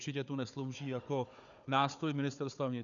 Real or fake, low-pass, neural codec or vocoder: fake; 7.2 kHz; codec, 16 kHz, 4 kbps, FunCodec, trained on Chinese and English, 50 frames a second